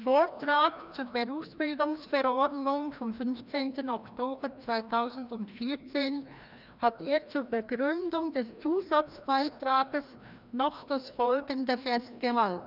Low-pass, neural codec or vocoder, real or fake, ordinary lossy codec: 5.4 kHz; codec, 16 kHz, 1 kbps, FreqCodec, larger model; fake; none